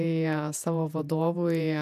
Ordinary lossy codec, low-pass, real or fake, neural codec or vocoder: AAC, 96 kbps; 14.4 kHz; fake; vocoder, 48 kHz, 128 mel bands, Vocos